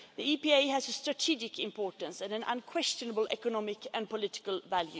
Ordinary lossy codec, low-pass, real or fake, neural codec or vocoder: none; none; real; none